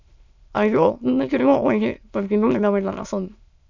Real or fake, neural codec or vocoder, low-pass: fake; autoencoder, 22.05 kHz, a latent of 192 numbers a frame, VITS, trained on many speakers; 7.2 kHz